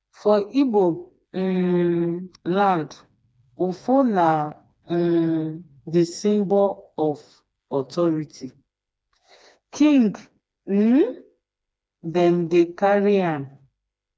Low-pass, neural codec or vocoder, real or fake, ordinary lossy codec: none; codec, 16 kHz, 2 kbps, FreqCodec, smaller model; fake; none